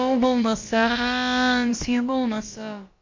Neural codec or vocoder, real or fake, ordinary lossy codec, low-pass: codec, 16 kHz, about 1 kbps, DyCAST, with the encoder's durations; fake; MP3, 48 kbps; 7.2 kHz